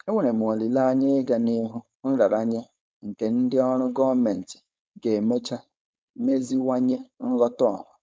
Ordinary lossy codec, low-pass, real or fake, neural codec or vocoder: none; none; fake; codec, 16 kHz, 4.8 kbps, FACodec